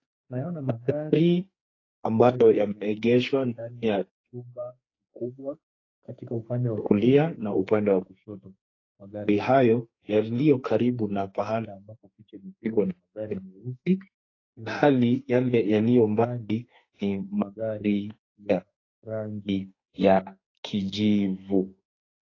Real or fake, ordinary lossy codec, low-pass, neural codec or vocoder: fake; AAC, 32 kbps; 7.2 kHz; codec, 44.1 kHz, 2.6 kbps, SNAC